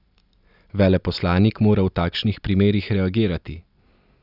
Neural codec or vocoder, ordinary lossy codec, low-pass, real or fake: none; none; 5.4 kHz; real